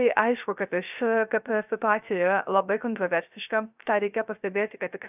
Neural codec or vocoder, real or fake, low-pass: codec, 16 kHz, 0.3 kbps, FocalCodec; fake; 3.6 kHz